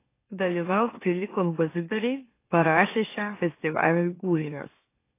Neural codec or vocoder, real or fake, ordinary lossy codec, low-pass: autoencoder, 44.1 kHz, a latent of 192 numbers a frame, MeloTTS; fake; AAC, 24 kbps; 3.6 kHz